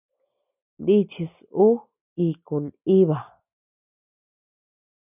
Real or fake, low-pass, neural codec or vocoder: real; 3.6 kHz; none